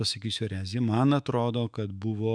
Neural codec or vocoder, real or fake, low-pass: autoencoder, 48 kHz, 128 numbers a frame, DAC-VAE, trained on Japanese speech; fake; 9.9 kHz